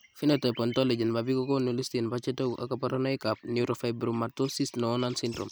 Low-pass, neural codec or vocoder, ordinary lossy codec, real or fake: none; none; none; real